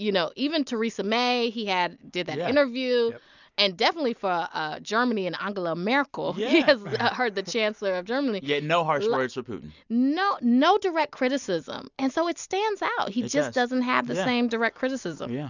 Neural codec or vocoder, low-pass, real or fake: none; 7.2 kHz; real